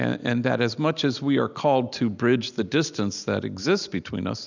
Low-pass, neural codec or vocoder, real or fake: 7.2 kHz; none; real